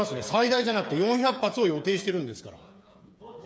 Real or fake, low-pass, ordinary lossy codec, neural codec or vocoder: fake; none; none; codec, 16 kHz, 8 kbps, FreqCodec, smaller model